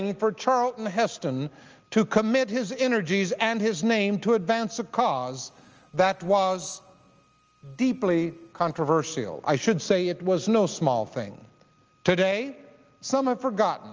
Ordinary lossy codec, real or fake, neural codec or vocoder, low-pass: Opus, 24 kbps; real; none; 7.2 kHz